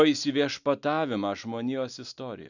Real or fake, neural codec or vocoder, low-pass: real; none; 7.2 kHz